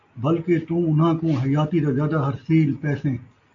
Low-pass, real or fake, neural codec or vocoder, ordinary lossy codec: 7.2 kHz; real; none; AAC, 64 kbps